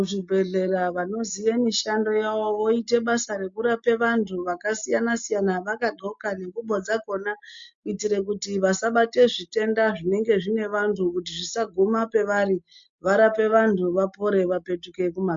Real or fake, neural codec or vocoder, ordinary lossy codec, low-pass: real; none; MP3, 48 kbps; 7.2 kHz